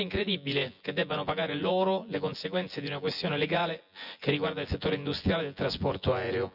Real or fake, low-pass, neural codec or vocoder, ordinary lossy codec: fake; 5.4 kHz; vocoder, 24 kHz, 100 mel bands, Vocos; none